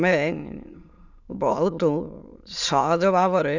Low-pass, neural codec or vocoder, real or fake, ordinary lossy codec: 7.2 kHz; autoencoder, 22.05 kHz, a latent of 192 numbers a frame, VITS, trained on many speakers; fake; none